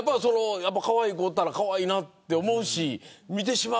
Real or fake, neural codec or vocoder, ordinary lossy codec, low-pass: real; none; none; none